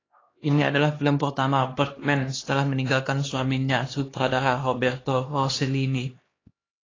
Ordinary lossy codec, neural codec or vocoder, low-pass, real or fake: AAC, 32 kbps; codec, 16 kHz, 2 kbps, X-Codec, HuBERT features, trained on LibriSpeech; 7.2 kHz; fake